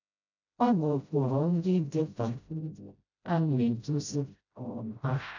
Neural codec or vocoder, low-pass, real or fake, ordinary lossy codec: codec, 16 kHz, 0.5 kbps, FreqCodec, smaller model; 7.2 kHz; fake; none